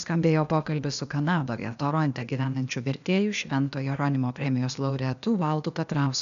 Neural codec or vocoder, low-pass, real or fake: codec, 16 kHz, 0.8 kbps, ZipCodec; 7.2 kHz; fake